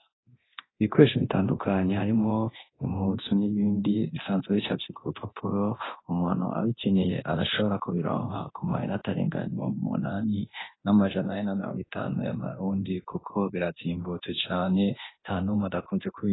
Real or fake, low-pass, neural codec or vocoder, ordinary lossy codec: fake; 7.2 kHz; codec, 24 kHz, 0.9 kbps, DualCodec; AAC, 16 kbps